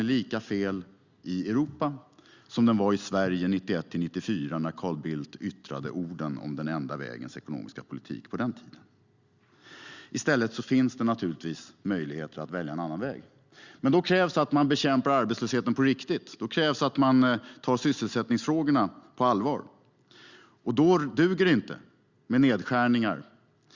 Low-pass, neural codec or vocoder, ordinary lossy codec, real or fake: 7.2 kHz; none; Opus, 64 kbps; real